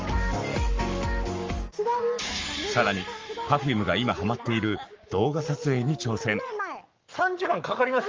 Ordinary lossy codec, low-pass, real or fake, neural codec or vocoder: Opus, 32 kbps; 7.2 kHz; fake; codec, 44.1 kHz, 7.8 kbps, Pupu-Codec